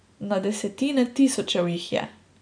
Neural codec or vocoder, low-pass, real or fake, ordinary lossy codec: none; 9.9 kHz; real; AAC, 64 kbps